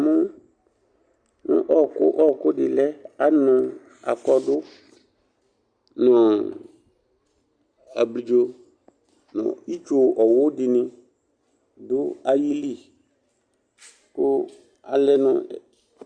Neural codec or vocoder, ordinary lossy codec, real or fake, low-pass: none; Opus, 64 kbps; real; 9.9 kHz